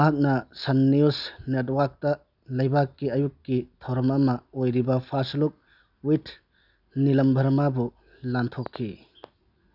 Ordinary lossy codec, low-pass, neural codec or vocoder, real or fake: none; 5.4 kHz; none; real